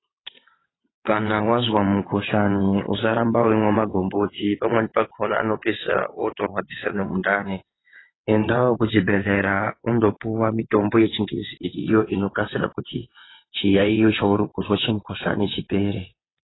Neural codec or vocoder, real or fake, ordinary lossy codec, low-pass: vocoder, 22.05 kHz, 80 mel bands, WaveNeXt; fake; AAC, 16 kbps; 7.2 kHz